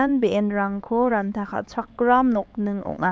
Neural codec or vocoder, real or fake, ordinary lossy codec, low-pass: codec, 16 kHz, 4 kbps, X-Codec, HuBERT features, trained on LibriSpeech; fake; none; none